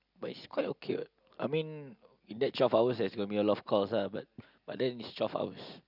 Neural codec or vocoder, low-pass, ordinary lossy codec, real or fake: none; 5.4 kHz; none; real